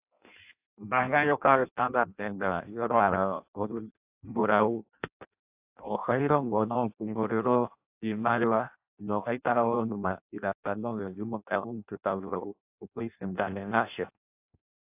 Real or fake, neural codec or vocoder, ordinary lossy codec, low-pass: fake; codec, 16 kHz in and 24 kHz out, 0.6 kbps, FireRedTTS-2 codec; AAC, 32 kbps; 3.6 kHz